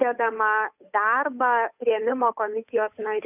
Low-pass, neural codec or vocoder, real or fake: 3.6 kHz; codec, 16 kHz, 8 kbps, FunCodec, trained on Chinese and English, 25 frames a second; fake